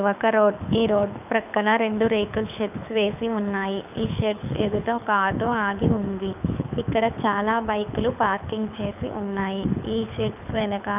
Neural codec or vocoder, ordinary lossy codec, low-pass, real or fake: codec, 24 kHz, 6 kbps, HILCodec; none; 3.6 kHz; fake